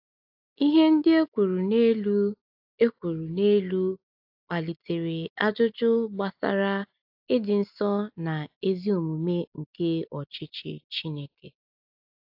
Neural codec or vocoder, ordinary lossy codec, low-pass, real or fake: none; AAC, 48 kbps; 5.4 kHz; real